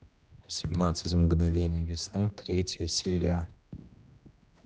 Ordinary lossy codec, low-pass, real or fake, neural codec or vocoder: none; none; fake; codec, 16 kHz, 1 kbps, X-Codec, HuBERT features, trained on general audio